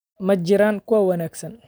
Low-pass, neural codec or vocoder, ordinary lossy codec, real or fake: none; vocoder, 44.1 kHz, 128 mel bands every 256 samples, BigVGAN v2; none; fake